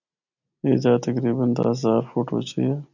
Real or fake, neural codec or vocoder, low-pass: real; none; 7.2 kHz